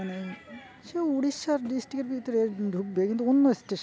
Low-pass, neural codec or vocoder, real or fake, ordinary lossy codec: none; none; real; none